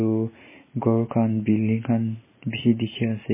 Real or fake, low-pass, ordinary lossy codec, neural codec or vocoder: real; 3.6 kHz; MP3, 16 kbps; none